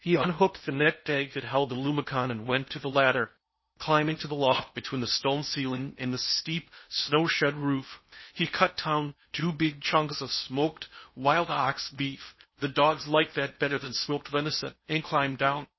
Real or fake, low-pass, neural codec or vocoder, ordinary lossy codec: fake; 7.2 kHz; codec, 16 kHz in and 24 kHz out, 0.6 kbps, FocalCodec, streaming, 2048 codes; MP3, 24 kbps